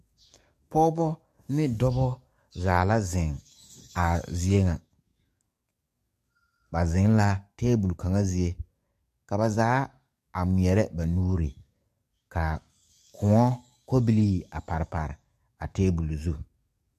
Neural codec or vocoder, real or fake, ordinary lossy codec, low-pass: codec, 44.1 kHz, 7.8 kbps, DAC; fake; MP3, 64 kbps; 14.4 kHz